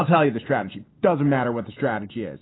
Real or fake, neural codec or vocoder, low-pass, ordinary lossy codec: real; none; 7.2 kHz; AAC, 16 kbps